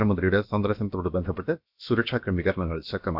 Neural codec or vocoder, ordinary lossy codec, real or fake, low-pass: codec, 16 kHz, about 1 kbps, DyCAST, with the encoder's durations; none; fake; 5.4 kHz